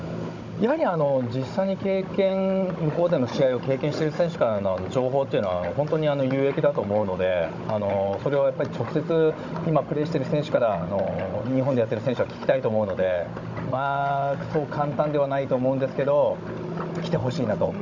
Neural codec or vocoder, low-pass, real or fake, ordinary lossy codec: codec, 16 kHz, 16 kbps, FunCodec, trained on Chinese and English, 50 frames a second; 7.2 kHz; fake; none